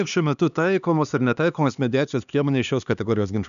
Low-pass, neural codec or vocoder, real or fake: 7.2 kHz; codec, 16 kHz, 2 kbps, X-Codec, HuBERT features, trained on LibriSpeech; fake